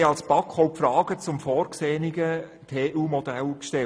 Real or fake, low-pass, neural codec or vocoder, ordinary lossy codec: real; none; none; none